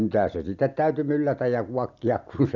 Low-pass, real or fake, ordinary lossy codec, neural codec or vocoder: 7.2 kHz; real; none; none